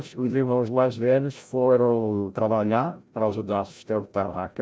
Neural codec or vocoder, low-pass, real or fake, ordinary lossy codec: codec, 16 kHz, 0.5 kbps, FreqCodec, larger model; none; fake; none